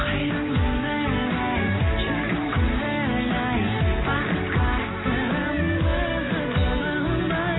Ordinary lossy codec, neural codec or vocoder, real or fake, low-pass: AAC, 16 kbps; none; real; 7.2 kHz